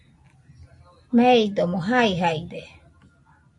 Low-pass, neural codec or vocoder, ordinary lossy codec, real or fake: 10.8 kHz; none; MP3, 48 kbps; real